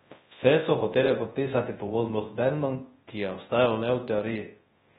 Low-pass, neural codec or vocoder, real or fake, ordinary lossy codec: 10.8 kHz; codec, 24 kHz, 0.9 kbps, WavTokenizer, large speech release; fake; AAC, 16 kbps